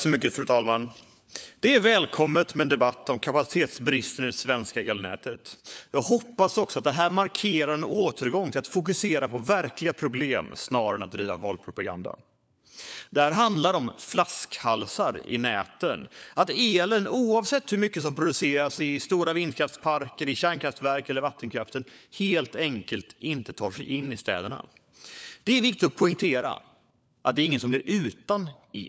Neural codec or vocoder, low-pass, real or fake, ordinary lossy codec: codec, 16 kHz, 4 kbps, FunCodec, trained on LibriTTS, 50 frames a second; none; fake; none